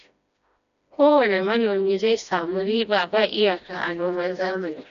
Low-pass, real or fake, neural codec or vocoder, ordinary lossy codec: 7.2 kHz; fake; codec, 16 kHz, 1 kbps, FreqCodec, smaller model; none